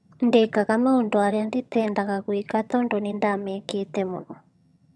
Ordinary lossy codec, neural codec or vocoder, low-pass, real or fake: none; vocoder, 22.05 kHz, 80 mel bands, HiFi-GAN; none; fake